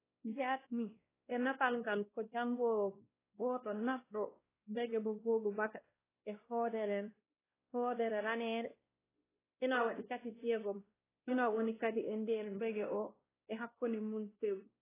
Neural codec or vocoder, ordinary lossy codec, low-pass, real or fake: codec, 16 kHz, 1 kbps, X-Codec, WavLM features, trained on Multilingual LibriSpeech; AAC, 16 kbps; 3.6 kHz; fake